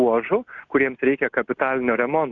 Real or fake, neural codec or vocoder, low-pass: real; none; 7.2 kHz